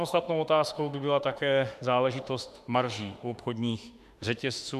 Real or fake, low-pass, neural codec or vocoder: fake; 14.4 kHz; autoencoder, 48 kHz, 32 numbers a frame, DAC-VAE, trained on Japanese speech